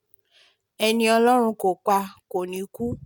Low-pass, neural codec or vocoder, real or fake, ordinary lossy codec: none; none; real; none